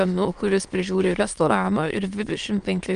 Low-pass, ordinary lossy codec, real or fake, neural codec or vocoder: 9.9 kHz; Opus, 32 kbps; fake; autoencoder, 22.05 kHz, a latent of 192 numbers a frame, VITS, trained on many speakers